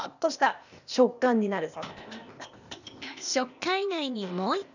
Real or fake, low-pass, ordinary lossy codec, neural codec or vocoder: fake; 7.2 kHz; none; codec, 16 kHz, 0.8 kbps, ZipCodec